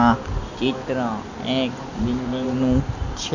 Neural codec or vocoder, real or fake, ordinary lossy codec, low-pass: none; real; none; 7.2 kHz